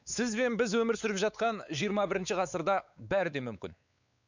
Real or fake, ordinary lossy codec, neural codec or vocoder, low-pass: fake; none; codec, 16 kHz, 4 kbps, X-Codec, WavLM features, trained on Multilingual LibriSpeech; 7.2 kHz